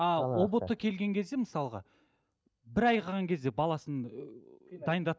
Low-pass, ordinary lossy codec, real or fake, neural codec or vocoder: none; none; real; none